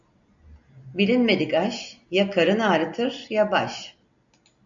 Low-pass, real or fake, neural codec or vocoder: 7.2 kHz; real; none